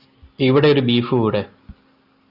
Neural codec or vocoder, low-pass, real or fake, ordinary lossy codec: none; 5.4 kHz; real; Opus, 64 kbps